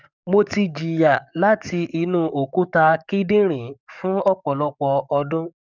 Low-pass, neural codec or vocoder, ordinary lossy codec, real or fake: 7.2 kHz; codec, 44.1 kHz, 7.8 kbps, DAC; none; fake